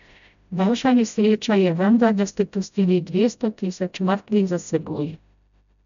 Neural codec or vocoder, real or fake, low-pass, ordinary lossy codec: codec, 16 kHz, 0.5 kbps, FreqCodec, smaller model; fake; 7.2 kHz; none